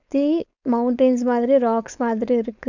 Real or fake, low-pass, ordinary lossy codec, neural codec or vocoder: fake; 7.2 kHz; none; codec, 16 kHz, 4.8 kbps, FACodec